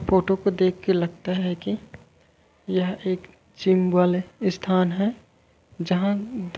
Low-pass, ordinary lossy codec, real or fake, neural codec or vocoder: none; none; real; none